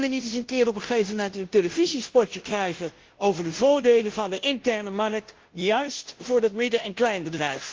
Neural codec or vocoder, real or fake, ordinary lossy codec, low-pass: codec, 16 kHz, 0.5 kbps, FunCodec, trained on Chinese and English, 25 frames a second; fake; Opus, 16 kbps; 7.2 kHz